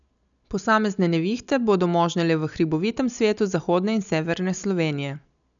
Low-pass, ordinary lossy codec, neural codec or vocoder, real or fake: 7.2 kHz; none; none; real